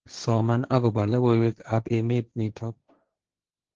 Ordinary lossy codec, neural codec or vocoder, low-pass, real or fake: Opus, 16 kbps; codec, 16 kHz, 1.1 kbps, Voila-Tokenizer; 7.2 kHz; fake